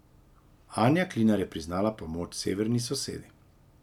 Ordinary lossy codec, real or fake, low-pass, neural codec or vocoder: none; real; 19.8 kHz; none